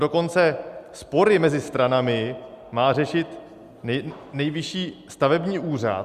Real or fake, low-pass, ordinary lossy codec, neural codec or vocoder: real; 14.4 kHz; Opus, 64 kbps; none